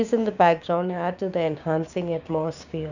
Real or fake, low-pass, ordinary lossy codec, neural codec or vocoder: fake; 7.2 kHz; none; codec, 16 kHz, 6 kbps, DAC